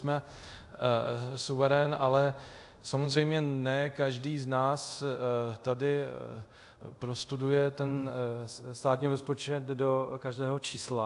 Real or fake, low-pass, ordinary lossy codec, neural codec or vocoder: fake; 10.8 kHz; AAC, 64 kbps; codec, 24 kHz, 0.5 kbps, DualCodec